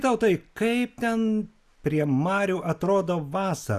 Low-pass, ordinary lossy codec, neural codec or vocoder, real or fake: 14.4 kHz; Opus, 64 kbps; none; real